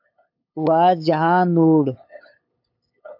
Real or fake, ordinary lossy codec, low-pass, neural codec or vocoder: fake; AAC, 48 kbps; 5.4 kHz; codec, 16 kHz, 8 kbps, FunCodec, trained on LibriTTS, 25 frames a second